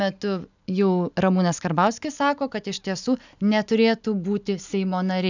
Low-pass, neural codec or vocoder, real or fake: 7.2 kHz; none; real